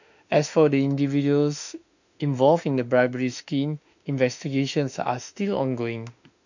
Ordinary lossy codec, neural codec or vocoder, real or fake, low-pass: none; autoencoder, 48 kHz, 32 numbers a frame, DAC-VAE, trained on Japanese speech; fake; 7.2 kHz